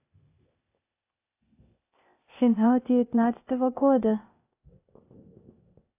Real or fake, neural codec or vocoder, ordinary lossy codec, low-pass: fake; codec, 16 kHz, 0.8 kbps, ZipCodec; AAC, 24 kbps; 3.6 kHz